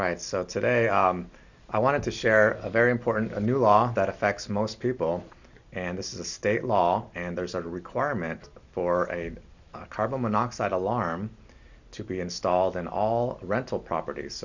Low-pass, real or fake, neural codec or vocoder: 7.2 kHz; real; none